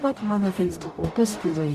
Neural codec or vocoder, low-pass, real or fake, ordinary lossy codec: codec, 44.1 kHz, 0.9 kbps, DAC; 14.4 kHz; fake; Opus, 64 kbps